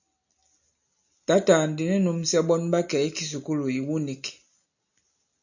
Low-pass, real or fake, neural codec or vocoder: 7.2 kHz; real; none